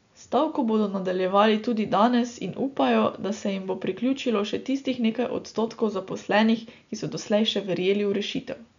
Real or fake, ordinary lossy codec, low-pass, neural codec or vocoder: real; none; 7.2 kHz; none